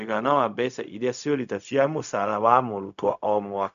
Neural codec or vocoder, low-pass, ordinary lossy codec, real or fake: codec, 16 kHz, 0.4 kbps, LongCat-Audio-Codec; 7.2 kHz; none; fake